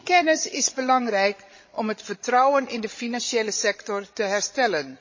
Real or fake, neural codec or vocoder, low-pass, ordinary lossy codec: fake; codec, 16 kHz, 16 kbps, FreqCodec, larger model; 7.2 kHz; MP3, 32 kbps